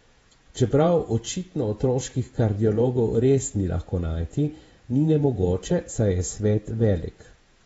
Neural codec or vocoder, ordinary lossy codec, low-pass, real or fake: vocoder, 48 kHz, 128 mel bands, Vocos; AAC, 24 kbps; 19.8 kHz; fake